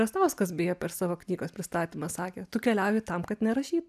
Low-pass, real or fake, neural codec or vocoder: 14.4 kHz; real; none